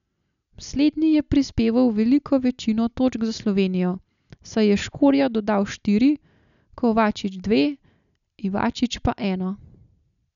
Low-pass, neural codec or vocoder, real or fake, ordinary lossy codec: 7.2 kHz; none; real; none